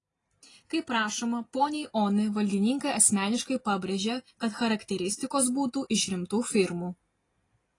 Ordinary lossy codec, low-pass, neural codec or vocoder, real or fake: AAC, 32 kbps; 10.8 kHz; none; real